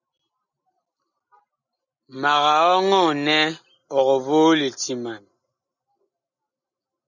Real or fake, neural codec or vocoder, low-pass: real; none; 7.2 kHz